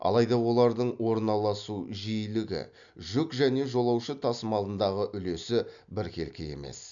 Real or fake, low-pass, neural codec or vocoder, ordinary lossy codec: real; 7.2 kHz; none; none